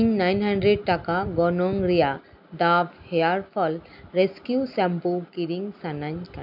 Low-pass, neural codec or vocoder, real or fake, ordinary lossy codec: 5.4 kHz; none; real; none